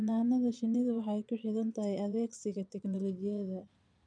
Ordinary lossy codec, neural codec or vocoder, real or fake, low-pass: none; vocoder, 22.05 kHz, 80 mel bands, Vocos; fake; 9.9 kHz